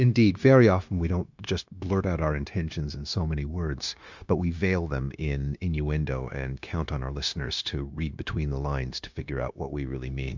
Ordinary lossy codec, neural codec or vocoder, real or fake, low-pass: MP3, 64 kbps; codec, 16 kHz, 0.9 kbps, LongCat-Audio-Codec; fake; 7.2 kHz